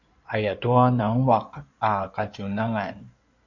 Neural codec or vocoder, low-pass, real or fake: codec, 16 kHz in and 24 kHz out, 2.2 kbps, FireRedTTS-2 codec; 7.2 kHz; fake